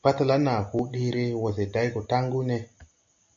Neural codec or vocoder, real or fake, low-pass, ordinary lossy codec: none; real; 7.2 kHz; MP3, 64 kbps